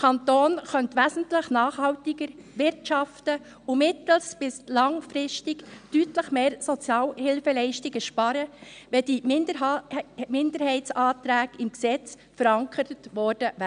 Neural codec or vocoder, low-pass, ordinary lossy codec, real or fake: none; 9.9 kHz; none; real